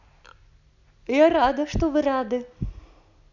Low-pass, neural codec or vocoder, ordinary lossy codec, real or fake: 7.2 kHz; none; none; real